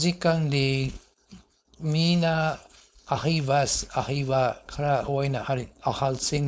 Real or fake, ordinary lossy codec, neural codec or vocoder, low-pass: fake; none; codec, 16 kHz, 4.8 kbps, FACodec; none